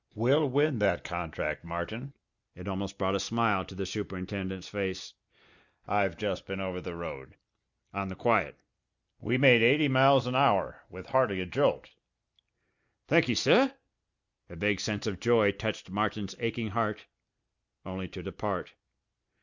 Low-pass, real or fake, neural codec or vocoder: 7.2 kHz; real; none